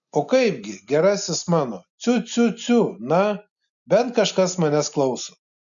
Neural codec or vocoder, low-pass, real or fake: none; 7.2 kHz; real